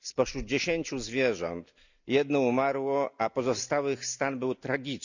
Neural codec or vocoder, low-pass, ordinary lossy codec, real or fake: none; 7.2 kHz; none; real